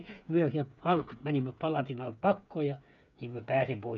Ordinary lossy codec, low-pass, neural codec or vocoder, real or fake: none; 7.2 kHz; codec, 16 kHz, 8 kbps, FreqCodec, smaller model; fake